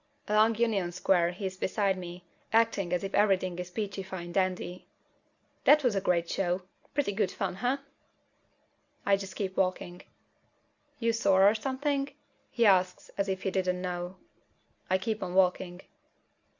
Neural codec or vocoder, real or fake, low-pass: none; real; 7.2 kHz